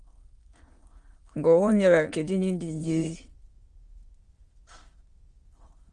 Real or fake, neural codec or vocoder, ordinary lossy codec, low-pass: fake; autoencoder, 22.05 kHz, a latent of 192 numbers a frame, VITS, trained on many speakers; Opus, 24 kbps; 9.9 kHz